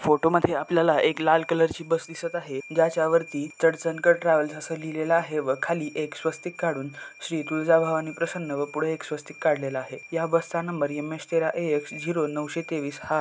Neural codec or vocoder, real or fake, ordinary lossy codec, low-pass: none; real; none; none